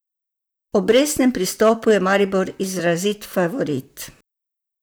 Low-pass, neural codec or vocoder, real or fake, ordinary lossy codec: none; vocoder, 44.1 kHz, 128 mel bands, Pupu-Vocoder; fake; none